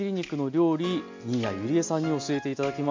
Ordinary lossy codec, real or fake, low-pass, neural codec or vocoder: MP3, 48 kbps; real; 7.2 kHz; none